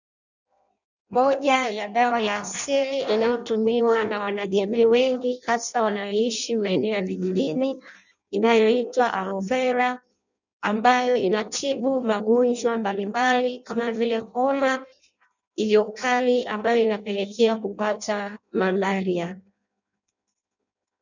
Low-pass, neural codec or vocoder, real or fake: 7.2 kHz; codec, 16 kHz in and 24 kHz out, 0.6 kbps, FireRedTTS-2 codec; fake